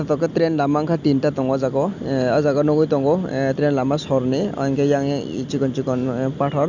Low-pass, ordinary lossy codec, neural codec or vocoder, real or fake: 7.2 kHz; none; none; real